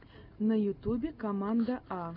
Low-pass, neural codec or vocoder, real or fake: 5.4 kHz; none; real